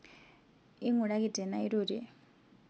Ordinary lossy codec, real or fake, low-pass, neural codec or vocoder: none; real; none; none